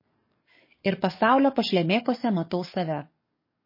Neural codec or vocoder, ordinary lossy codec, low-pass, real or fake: codec, 44.1 kHz, 7.8 kbps, DAC; MP3, 24 kbps; 5.4 kHz; fake